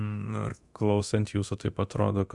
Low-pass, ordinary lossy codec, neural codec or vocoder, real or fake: 10.8 kHz; Opus, 64 kbps; codec, 24 kHz, 0.9 kbps, DualCodec; fake